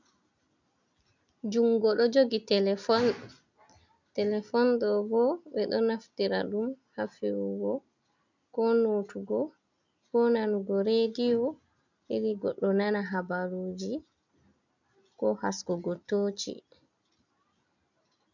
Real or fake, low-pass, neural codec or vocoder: real; 7.2 kHz; none